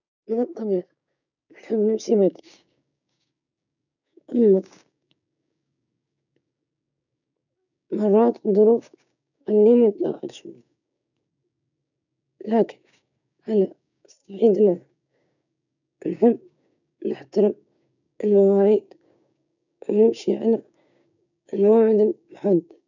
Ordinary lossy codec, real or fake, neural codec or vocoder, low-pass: none; fake; codec, 16 kHz, 6 kbps, DAC; 7.2 kHz